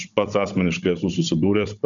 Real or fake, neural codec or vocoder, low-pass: fake; codec, 16 kHz, 16 kbps, FunCodec, trained on Chinese and English, 50 frames a second; 7.2 kHz